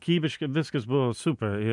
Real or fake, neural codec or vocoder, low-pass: real; none; 10.8 kHz